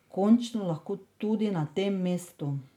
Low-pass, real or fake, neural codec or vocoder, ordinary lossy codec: 19.8 kHz; real; none; MP3, 96 kbps